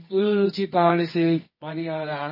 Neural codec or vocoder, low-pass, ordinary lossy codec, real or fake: codec, 24 kHz, 0.9 kbps, WavTokenizer, medium music audio release; 5.4 kHz; MP3, 24 kbps; fake